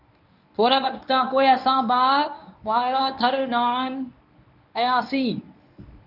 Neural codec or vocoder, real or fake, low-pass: codec, 24 kHz, 0.9 kbps, WavTokenizer, medium speech release version 1; fake; 5.4 kHz